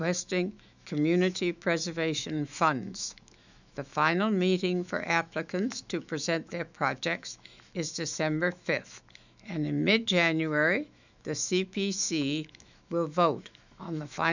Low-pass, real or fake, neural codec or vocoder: 7.2 kHz; fake; autoencoder, 48 kHz, 128 numbers a frame, DAC-VAE, trained on Japanese speech